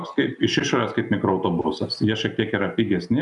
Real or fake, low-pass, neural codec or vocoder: real; 10.8 kHz; none